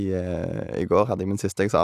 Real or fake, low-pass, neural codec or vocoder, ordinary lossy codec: real; 14.4 kHz; none; AAC, 96 kbps